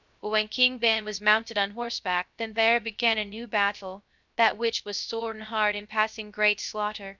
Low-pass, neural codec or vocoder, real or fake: 7.2 kHz; codec, 16 kHz, 0.3 kbps, FocalCodec; fake